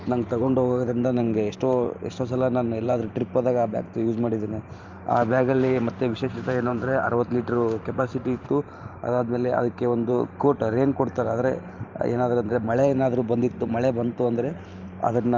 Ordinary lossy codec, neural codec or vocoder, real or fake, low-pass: Opus, 24 kbps; vocoder, 44.1 kHz, 128 mel bands every 512 samples, BigVGAN v2; fake; 7.2 kHz